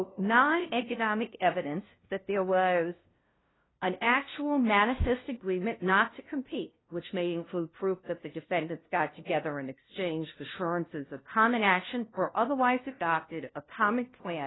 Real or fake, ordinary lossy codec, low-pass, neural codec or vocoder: fake; AAC, 16 kbps; 7.2 kHz; codec, 16 kHz, 0.5 kbps, FunCodec, trained on LibriTTS, 25 frames a second